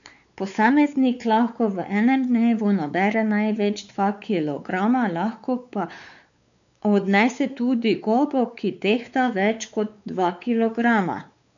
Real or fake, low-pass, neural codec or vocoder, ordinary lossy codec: fake; 7.2 kHz; codec, 16 kHz, 4 kbps, X-Codec, WavLM features, trained on Multilingual LibriSpeech; none